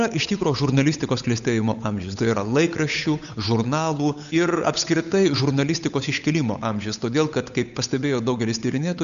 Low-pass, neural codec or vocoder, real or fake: 7.2 kHz; codec, 16 kHz, 8 kbps, FunCodec, trained on Chinese and English, 25 frames a second; fake